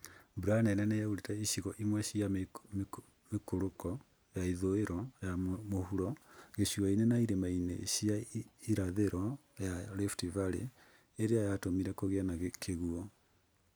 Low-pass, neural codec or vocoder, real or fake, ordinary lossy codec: none; none; real; none